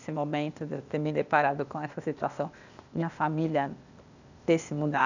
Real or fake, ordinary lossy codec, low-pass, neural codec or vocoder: fake; none; 7.2 kHz; codec, 16 kHz, 0.8 kbps, ZipCodec